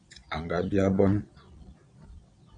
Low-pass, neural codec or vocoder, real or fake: 9.9 kHz; vocoder, 22.05 kHz, 80 mel bands, Vocos; fake